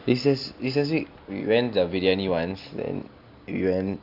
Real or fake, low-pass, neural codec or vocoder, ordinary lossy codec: real; 5.4 kHz; none; Opus, 64 kbps